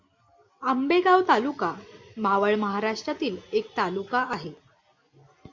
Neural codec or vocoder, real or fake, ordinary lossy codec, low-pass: none; real; MP3, 64 kbps; 7.2 kHz